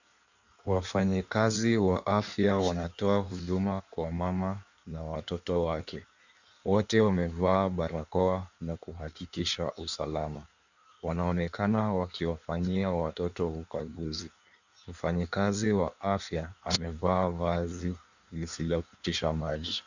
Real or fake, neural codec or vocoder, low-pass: fake; codec, 16 kHz in and 24 kHz out, 1.1 kbps, FireRedTTS-2 codec; 7.2 kHz